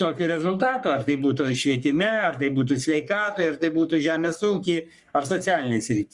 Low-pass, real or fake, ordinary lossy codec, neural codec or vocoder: 10.8 kHz; fake; Opus, 64 kbps; codec, 44.1 kHz, 3.4 kbps, Pupu-Codec